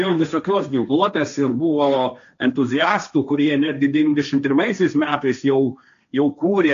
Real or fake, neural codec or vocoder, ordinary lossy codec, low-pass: fake; codec, 16 kHz, 1.1 kbps, Voila-Tokenizer; MP3, 64 kbps; 7.2 kHz